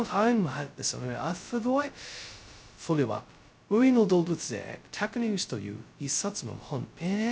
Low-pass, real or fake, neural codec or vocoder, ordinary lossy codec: none; fake; codec, 16 kHz, 0.2 kbps, FocalCodec; none